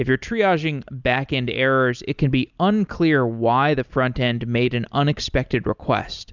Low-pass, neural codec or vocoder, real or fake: 7.2 kHz; none; real